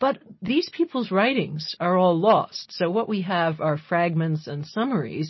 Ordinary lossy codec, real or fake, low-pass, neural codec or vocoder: MP3, 24 kbps; real; 7.2 kHz; none